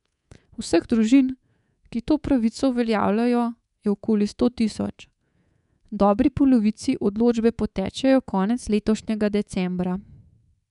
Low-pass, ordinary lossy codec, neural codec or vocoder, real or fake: 10.8 kHz; none; codec, 24 kHz, 3.1 kbps, DualCodec; fake